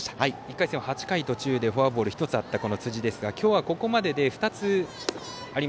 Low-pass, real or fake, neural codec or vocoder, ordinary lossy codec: none; real; none; none